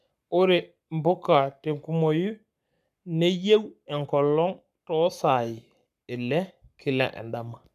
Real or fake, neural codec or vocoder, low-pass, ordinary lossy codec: fake; codec, 44.1 kHz, 7.8 kbps, Pupu-Codec; 14.4 kHz; none